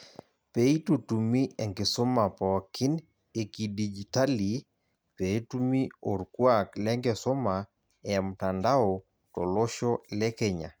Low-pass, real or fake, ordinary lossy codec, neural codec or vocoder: none; real; none; none